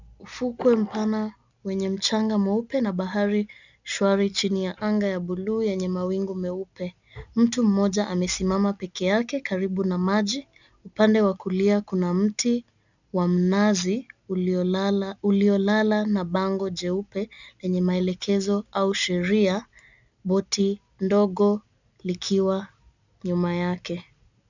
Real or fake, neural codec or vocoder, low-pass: real; none; 7.2 kHz